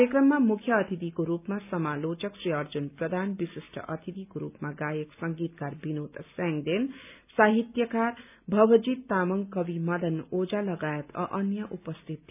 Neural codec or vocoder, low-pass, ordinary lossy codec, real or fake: none; 3.6 kHz; none; real